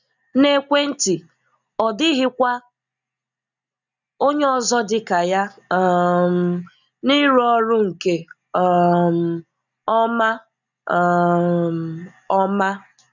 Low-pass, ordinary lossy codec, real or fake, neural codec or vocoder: 7.2 kHz; none; real; none